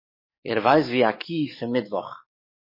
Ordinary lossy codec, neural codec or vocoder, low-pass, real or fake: MP3, 24 kbps; codec, 44.1 kHz, 7.8 kbps, DAC; 5.4 kHz; fake